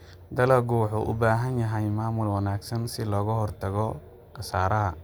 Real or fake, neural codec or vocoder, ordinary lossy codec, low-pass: real; none; none; none